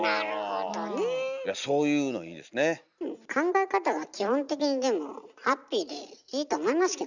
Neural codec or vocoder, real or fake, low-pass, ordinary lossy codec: none; real; 7.2 kHz; none